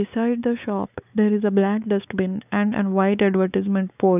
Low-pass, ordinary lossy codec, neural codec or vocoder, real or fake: 3.6 kHz; none; codec, 16 kHz, 4 kbps, X-Codec, WavLM features, trained on Multilingual LibriSpeech; fake